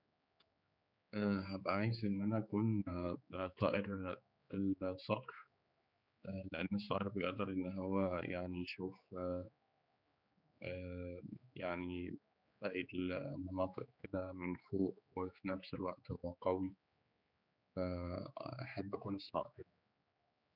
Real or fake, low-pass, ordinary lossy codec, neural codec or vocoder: fake; 5.4 kHz; none; codec, 16 kHz, 4 kbps, X-Codec, HuBERT features, trained on general audio